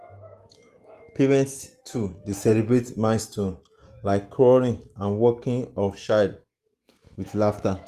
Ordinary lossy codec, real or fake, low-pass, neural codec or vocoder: Opus, 24 kbps; fake; 14.4 kHz; autoencoder, 48 kHz, 128 numbers a frame, DAC-VAE, trained on Japanese speech